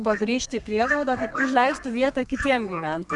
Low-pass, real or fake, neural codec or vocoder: 10.8 kHz; fake; codec, 32 kHz, 1.9 kbps, SNAC